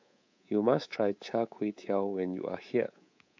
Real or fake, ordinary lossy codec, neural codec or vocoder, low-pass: fake; MP3, 48 kbps; codec, 24 kHz, 3.1 kbps, DualCodec; 7.2 kHz